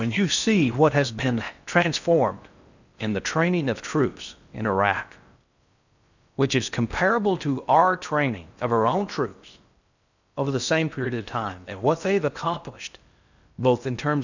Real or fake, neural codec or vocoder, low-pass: fake; codec, 16 kHz in and 24 kHz out, 0.6 kbps, FocalCodec, streaming, 4096 codes; 7.2 kHz